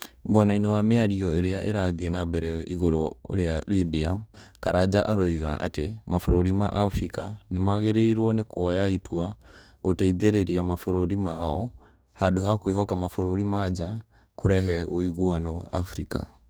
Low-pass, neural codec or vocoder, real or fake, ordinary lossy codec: none; codec, 44.1 kHz, 2.6 kbps, DAC; fake; none